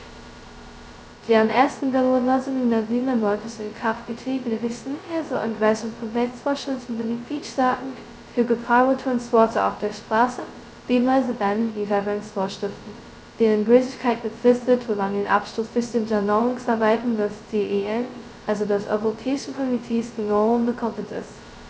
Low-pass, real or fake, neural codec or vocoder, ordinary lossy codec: none; fake; codec, 16 kHz, 0.2 kbps, FocalCodec; none